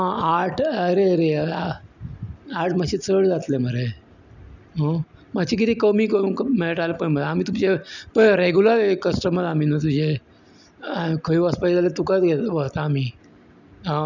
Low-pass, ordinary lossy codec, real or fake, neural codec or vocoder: 7.2 kHz; none; real; none